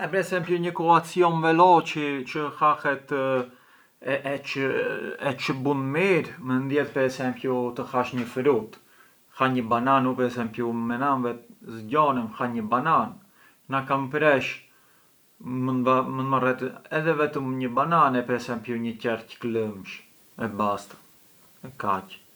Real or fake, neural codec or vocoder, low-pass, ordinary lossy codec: real; none; none; none